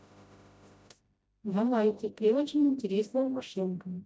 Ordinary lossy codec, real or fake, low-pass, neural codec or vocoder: none; fake; none; codec, 16 kHz, 0.5 kbps, FreqCodec, smaller model